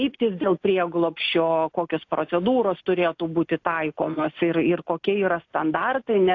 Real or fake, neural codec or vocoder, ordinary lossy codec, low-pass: real; none; AAC, 48 kbps; 7.2 kHz